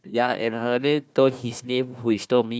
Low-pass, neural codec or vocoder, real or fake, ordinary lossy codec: none; codec, 16 kHz, 1 kbps, FunCodec, trained on Chinese and English, 50 frames a second; fake; none